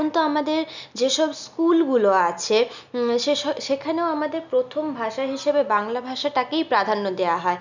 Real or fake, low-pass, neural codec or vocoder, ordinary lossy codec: real; 7.2 kHz; none; none